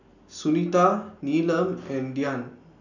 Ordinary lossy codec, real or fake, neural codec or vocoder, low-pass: none; real; none; 7.2 kHz